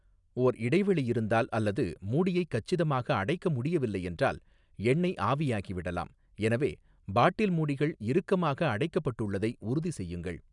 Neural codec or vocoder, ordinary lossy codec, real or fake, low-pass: none; none; real; 10.8 kHz